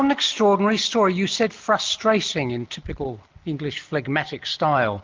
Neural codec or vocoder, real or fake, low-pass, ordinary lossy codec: none; real; 7.2 kHz; Opus, 32 kbps